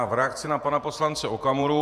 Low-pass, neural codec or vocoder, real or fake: 14.4 kHz; vocoder, 48 kHz, 128 mel bands, Vocos; fake